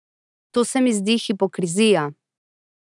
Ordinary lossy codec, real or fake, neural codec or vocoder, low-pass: none; real; none; 10.8 kHz